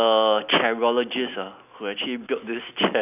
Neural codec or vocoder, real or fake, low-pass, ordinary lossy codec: none; real; 3.6 kHz; none